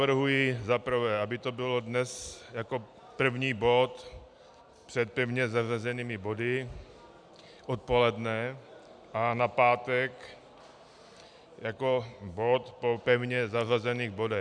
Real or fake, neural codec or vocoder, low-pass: real; none; 9.9 kHz